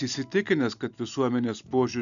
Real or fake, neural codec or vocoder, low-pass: real; none; 7.2 kHz